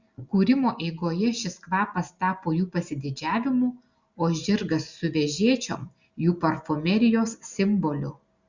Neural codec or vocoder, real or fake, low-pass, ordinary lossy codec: none; real; 7.2 kHz; Opus, 64 kbps